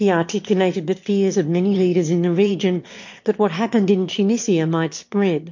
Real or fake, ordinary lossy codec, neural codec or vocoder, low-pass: fake; MP3, 48 kbps; autoencoder, 22.05 kHz, a latent of 192 numbers a frame, VITS, trained on one speaker; 7.2 kHz